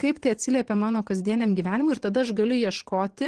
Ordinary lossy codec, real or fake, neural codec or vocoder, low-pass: Opus, 16 kbps; fake; vocoder, 22.05 kHz, 80 mel bands, WaveNeXt; 9.9 kHz